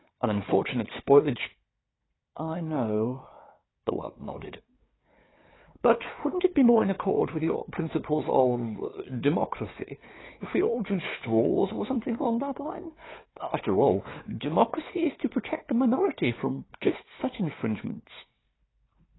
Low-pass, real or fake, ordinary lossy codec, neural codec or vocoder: 7.2 kHz; fake; AAC, 16 kbps; codec, 16 kHz, 4 kbps, FunCodec, trained on Chinese and English, 50 frames a second